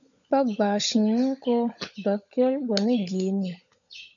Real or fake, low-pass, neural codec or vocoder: fake; 7.2 kHz; codec, 16 kHz, 16 kbps, FunCodec, trained on LibriTTS, 50 frames a second